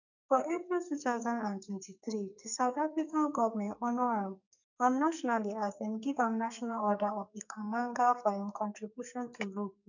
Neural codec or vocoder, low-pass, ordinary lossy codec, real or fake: codec, 44.1 kHz, 2.6 kbps, SNAC; 7.2 kHz; none; fake